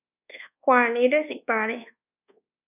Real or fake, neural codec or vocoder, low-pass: fake; codec, 24 kHz, 1.2 kbps, DualCodec; 3.6 kHz